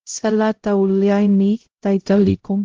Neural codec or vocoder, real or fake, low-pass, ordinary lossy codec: codec, 16 kHz, 0.5 kbps, X-Codec, HuBERT features, trained on LibriSpeech; fake; 7.2 kHz; Opus, 16 kbps